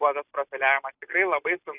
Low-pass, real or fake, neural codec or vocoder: 3.6 kHz; real; none